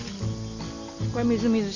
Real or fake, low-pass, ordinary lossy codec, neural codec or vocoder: real; 7.2 kHz; none; none